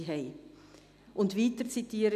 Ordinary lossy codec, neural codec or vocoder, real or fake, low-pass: none; none; real; 14.4 kHz